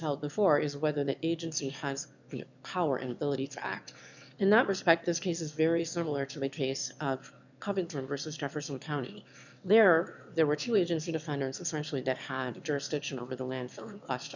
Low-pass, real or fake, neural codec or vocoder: 7.2 kHz; fake; autoencoder, 22.05 kHz, a latent of 192 numbers a frame, VITS, trained on one speaker